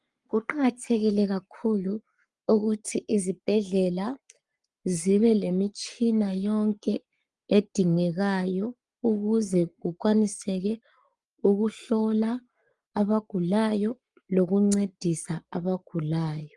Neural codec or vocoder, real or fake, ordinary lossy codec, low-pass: codec, 44.1 kHz, 7.8 kbps, Pupu-Codec; fake; Opus, 32 kbps; 10.8 kHz